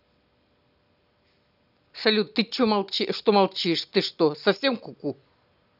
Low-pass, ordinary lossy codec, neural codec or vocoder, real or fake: 5.4 kHz; none; none; real